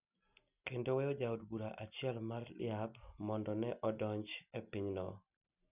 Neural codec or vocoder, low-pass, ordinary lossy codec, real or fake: none; 3.6 kHz; none; real